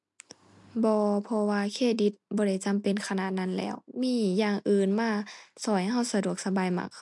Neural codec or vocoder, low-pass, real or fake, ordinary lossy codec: none; 10.8 kHz; real; MP3, 64 kbps